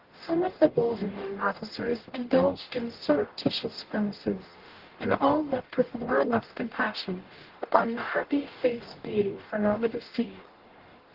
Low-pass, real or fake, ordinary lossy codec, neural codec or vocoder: 5.4 kHz; fake; Opus, 16 kbps; codec, 44.1 kHz, 0.9 kbps, DAC